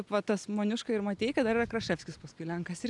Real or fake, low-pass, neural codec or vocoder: real; 10.8 kHz; none